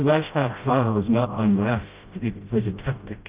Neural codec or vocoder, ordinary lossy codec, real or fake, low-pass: codec, 16 kHz, 0.5 kbps, FreqCodec, smaller model; Opus, 32 kbps; fake; 3.6 kHz